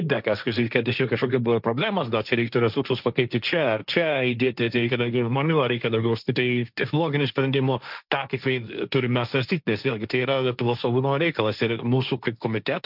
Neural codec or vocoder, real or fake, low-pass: codec, 16 kHz, 1.1 kbps, Voila-Tokenizer; fake; 5.4 kHz